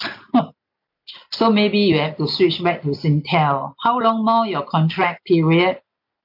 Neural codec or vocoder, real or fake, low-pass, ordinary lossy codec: none; real; 5.4 kHz; none